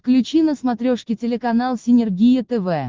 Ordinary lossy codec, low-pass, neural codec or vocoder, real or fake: Opus, 16 kbps; 7.2 kHz; none; real